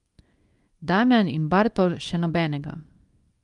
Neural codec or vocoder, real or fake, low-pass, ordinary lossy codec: codec, 24 kHz, 0.9 kbps, WavTokenizer, small release; fake; 10.8 kHz; Opus, 32 kbps